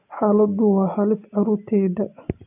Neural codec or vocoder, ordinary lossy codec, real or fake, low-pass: none; none; real; 3.6 kHz